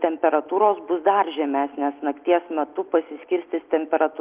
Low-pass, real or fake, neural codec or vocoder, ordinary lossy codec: 3.6 kHz; real; none; Opus, 32 kbps